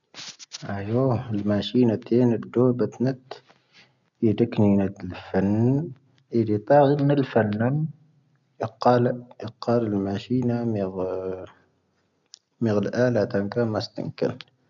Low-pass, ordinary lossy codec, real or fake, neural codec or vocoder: 7.2 kHz; none; real; none